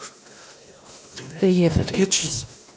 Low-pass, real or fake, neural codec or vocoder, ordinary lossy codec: none; fake; codec, 16 kHz, 1 kbps, X-Codec, WavLM features, trained on Multilingual LibriSpeech; none